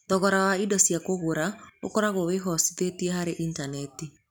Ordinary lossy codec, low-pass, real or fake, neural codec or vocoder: none; none; real; none